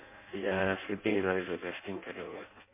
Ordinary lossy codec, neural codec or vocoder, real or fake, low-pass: MP3, 24 kbps; codec, 16 kHz in and 24 kHz out, 0.6 kbps, FireRedTTS-2 codec; fake; 3.6 kHz